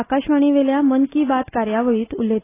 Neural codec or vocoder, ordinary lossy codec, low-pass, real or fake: none; AAC, 16 kbps; 3.6 kHz; real